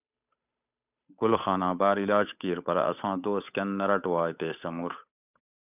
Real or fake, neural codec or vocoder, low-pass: fake; codec, 16 kHz, 8 kbps, FunCodec, trained on Chinese and English, 25 frames a second; 3.6 kHz